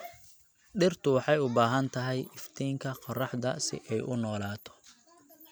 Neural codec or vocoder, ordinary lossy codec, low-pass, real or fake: none; none; none; real